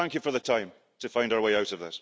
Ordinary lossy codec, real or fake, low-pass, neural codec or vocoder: none; real; none; none